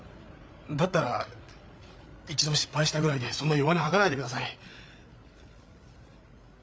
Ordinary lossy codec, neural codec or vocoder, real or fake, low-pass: none; codec, 16 kHz, 8 kbps, FreqCodec, larger model; fake; none